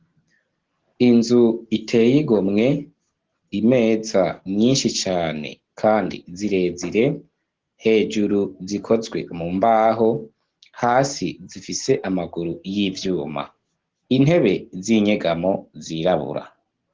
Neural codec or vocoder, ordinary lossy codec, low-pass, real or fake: none; Opus, 16 kbps; 7.2 kHz; real